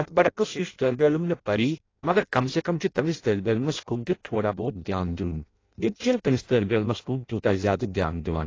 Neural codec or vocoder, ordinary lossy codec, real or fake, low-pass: codec, 16 kHz in and 24 kHz out, 0.6 kbps, FireRedTTS-2 codec; AAC, 32 kbps; fake; 7.2 kHz